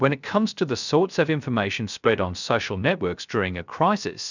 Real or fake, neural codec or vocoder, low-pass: fake; codec, 16 kHz, 0.3 kbps, FocalCodec; 7.2 kHz